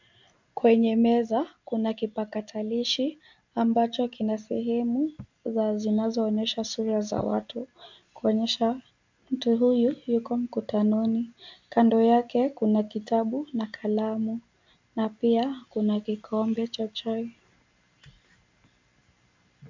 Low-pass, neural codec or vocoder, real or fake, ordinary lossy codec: 7.2 kHz; none; real; MP3, 64 kbps